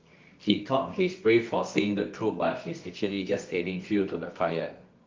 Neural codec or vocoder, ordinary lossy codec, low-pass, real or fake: codec, 24 kHz, 0.9 kbps, WavTokenizer, medium music audio release; Opus, 24 kbps; 7.2 kHz; fake